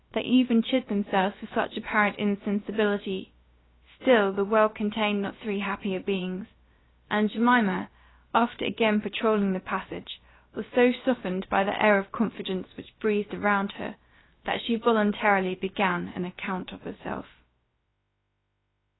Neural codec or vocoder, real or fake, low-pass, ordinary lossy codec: codec, 16 kHz, about 1 kbps, DyCAST, with the encoder's durations; fake; 7.2 kHz; AAC, 16 kbps